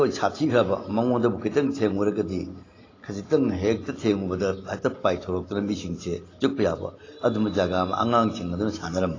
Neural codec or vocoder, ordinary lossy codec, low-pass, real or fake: none; AAC, 32 kbps; 7.2 kHz; real